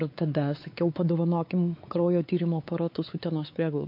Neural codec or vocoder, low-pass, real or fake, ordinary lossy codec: codec, 16 kHz, 4 kbps, X-Codec, WavLM features, trained on Multilingual LibriSpeech; 5.4 kHz; fake; MP3, 32 kbps